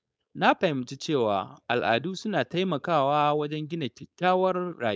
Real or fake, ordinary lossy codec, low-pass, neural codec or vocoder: fake; none; none; codec, 16 kHz, 4.8 kbps, FACodec